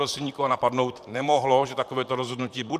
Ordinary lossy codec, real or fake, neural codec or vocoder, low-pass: MP3, 96 kbps; fake; vocoder, 44.1 kHz, 128 mel bands, Pupu-Vocoder; 14.4 kHz